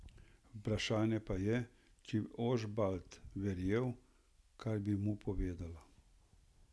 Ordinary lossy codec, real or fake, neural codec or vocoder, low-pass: none; real; none; none